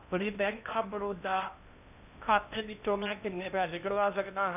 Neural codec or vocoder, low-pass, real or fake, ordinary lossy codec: codec, 16 kHz in and 24 kHz out, 0.8 kbps, FocalCodec, streaming, 65536 codes; 3.6 kHz; fake; none